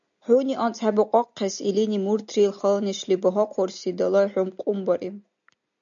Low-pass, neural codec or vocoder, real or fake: 7.2 kHz; none; real